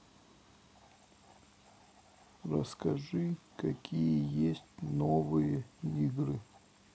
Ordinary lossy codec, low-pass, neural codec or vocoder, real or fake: none; none; none; real